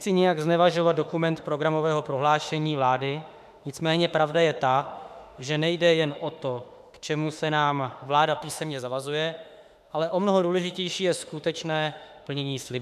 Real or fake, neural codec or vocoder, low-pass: fake; autoencoder, 48 kHz, 32 numbers a frame, DAC-VAE, trained on Japanese speech; 14.4 kHz